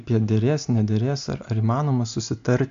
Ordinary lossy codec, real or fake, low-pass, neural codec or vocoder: AAC, 64 kbps; real; 7.2 kHz; none